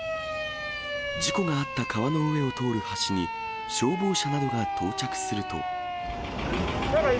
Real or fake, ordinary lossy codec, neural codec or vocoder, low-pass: real; none; none; none